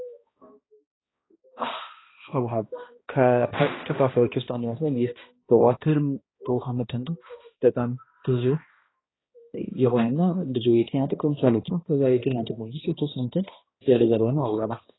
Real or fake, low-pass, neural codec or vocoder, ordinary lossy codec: fake; 7.2 kHz; codec, 16 kHz, 1 kbps, X-Codec, HuBERT features, trained on balanced general audio; AAC, 16 kbps